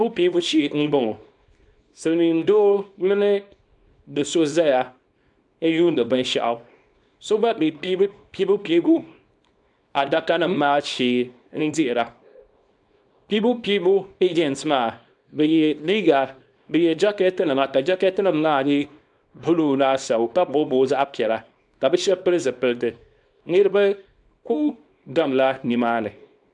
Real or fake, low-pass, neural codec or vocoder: fake; 10.8 kHz; codec, 24 kHz, 0.9 kbps, WavTokenizer, small release